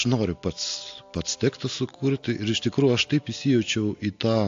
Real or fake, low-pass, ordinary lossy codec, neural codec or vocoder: real; 7.2 kHz; AAC, 48 kbps; none